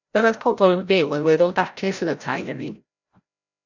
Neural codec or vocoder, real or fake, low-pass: codec, 16 kHz, 0.5 kbps, FreqCodec, larger model; fake; 7.2 kHz